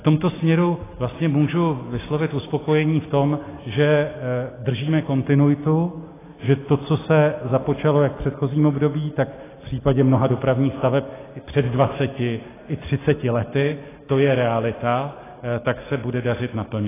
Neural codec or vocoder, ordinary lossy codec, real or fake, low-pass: none; AAC, 16 kbps; real; 3.6 kHz